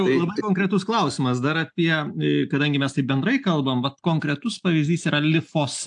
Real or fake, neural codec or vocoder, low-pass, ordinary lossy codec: real; none; 9.9 kHz; AAC, 64 kbps